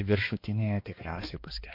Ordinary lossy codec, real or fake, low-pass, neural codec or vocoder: MP3, 32 kbps; fake; 5.4 kHz; codec, 16 kHz, 2 kbps, X-Codec, HuBERT features, trained on balanced general audio